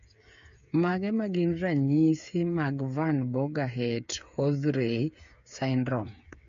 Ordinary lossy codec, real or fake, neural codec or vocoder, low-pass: MP3, 48 kbps; fake; codec, 16 kHz, 8 kbps, FreqCodec, smaller model; 7.2 kHz